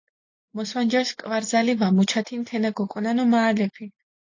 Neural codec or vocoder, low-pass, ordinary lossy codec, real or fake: none; 7.2 kHz; AAC, 48 kbps; real